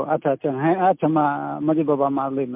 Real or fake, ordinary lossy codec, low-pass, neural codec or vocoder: real; MP3, 32 kbps; 3.6 kHz; none